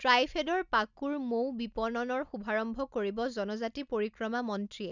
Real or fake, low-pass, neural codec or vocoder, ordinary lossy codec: real; 7.2 kHz; none; none